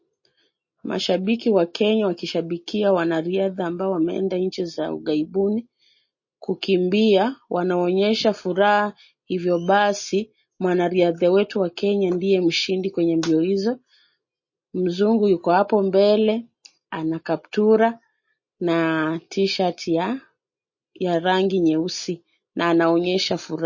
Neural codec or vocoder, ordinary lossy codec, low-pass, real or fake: none; MP3, 32 kbps; 7.2 kHz; real